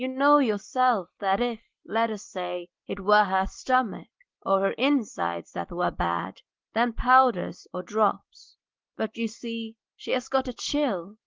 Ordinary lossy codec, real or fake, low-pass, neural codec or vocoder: Opus, 16 kbps; real; 7.2 kHz; none